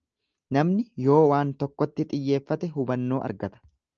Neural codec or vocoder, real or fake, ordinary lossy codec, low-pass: none; real; Opus, 24 kbps; 7.2 kHz